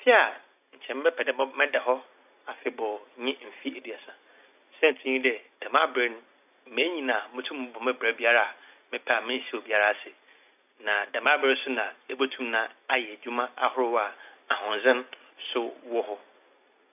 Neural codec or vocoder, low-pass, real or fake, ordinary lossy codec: none; 3.6 kHz; real; AAC, 32 kbps